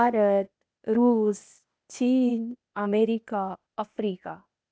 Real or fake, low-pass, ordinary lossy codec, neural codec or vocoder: fake; none; none; codec, 16 kHz, 0.8 kbps, ZipCodec